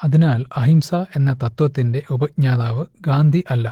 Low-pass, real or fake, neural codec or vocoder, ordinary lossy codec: 19.8 kHz; fake; autoencoder, 48 kHz, 128 numbers a frame, DAC-VAE, trained on Japanese speech; Opus, 16 kbps